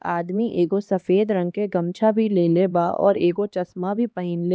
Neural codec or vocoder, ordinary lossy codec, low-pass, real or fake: codec, 16 kHz, 2 kbps, X-Codec, HuBERT features, trained on LibriSpeech; none; none; fake